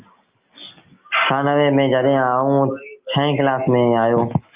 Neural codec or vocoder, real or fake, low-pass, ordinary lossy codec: none; real; 3.6 kHz; Opus, 24 kbps